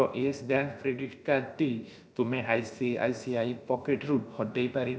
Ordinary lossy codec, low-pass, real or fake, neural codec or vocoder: none; none; fake; codec, 16 kHz, about 1 kbps, DyCAST, with the encoder's durations